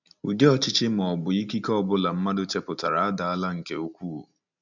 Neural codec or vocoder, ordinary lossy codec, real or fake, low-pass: none; none; real; 7.2 kHz